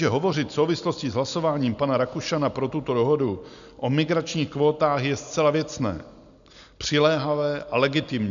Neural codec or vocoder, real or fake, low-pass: none; real; 7.2 kHz